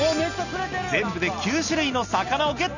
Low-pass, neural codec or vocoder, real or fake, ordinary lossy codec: 7.2 kHz; none; real; none